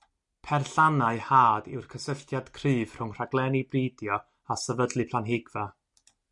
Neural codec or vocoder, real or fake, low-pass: none; real; 10.8 kHz